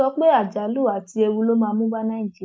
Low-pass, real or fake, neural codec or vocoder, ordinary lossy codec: none; real; none; none